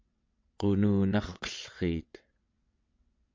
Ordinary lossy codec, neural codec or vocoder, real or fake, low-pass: MP3, 48 kbps; vocoder, 22.05 kHz, 80 mel bands, WaveNeXt; fake; 7.2 kHz